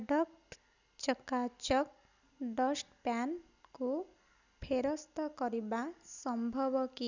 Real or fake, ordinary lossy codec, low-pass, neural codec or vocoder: real; none; 7.2 kHz; none